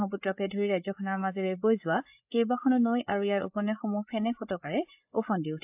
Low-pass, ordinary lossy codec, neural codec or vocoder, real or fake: 3.6 kHz; AAC, 32 kbps; codec, 16 kHz, 8 kbps, FreqCodec, larger model; fake